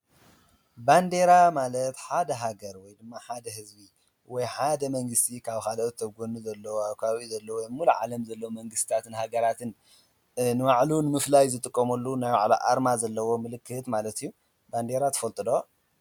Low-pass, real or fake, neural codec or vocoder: 19.8 kHz; real; none